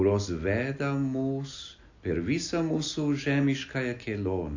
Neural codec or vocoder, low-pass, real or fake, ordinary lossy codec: none; 7.2 kHz; real; AAC, 48 kbps